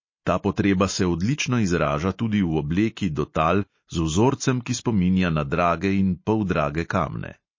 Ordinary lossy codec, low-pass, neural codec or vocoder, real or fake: MP3, 32 kbps; 7.2 kHz; none; real